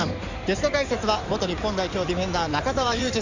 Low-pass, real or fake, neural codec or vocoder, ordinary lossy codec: 7.2 kHz; fake; codec, 16 kHz in and 24 kHz out, 2.2 kbps, FireRedTTS-2 codec; Opus, 64 kbps